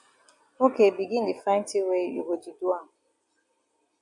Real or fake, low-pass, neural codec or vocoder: real; 10.8 kHz; none